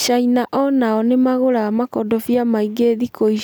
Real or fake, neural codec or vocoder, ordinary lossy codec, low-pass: real; none; none; none